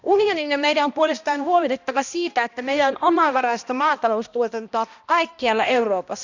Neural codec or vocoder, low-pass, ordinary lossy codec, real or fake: codec, 16 kHz, 1 kbps, X-Codec, HuBERT features, trained on balanced general audio; 7.2 kHz; none; fake